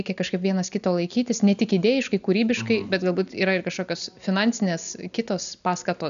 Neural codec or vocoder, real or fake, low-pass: none; real; 7.2 kHz